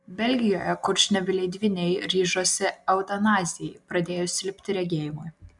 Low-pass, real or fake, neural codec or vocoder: 10.8 kHz; real; none